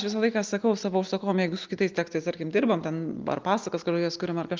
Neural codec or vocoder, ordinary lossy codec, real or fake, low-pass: none; Opus, 32 kbps; real; 7.2 kHz